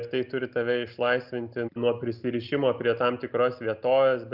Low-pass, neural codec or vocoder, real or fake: 5.4 kHz; none; real